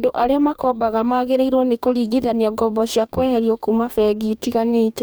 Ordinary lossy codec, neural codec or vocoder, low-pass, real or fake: none; codec, 44.1 kHz, 2.6 kbps, DAC; none; fake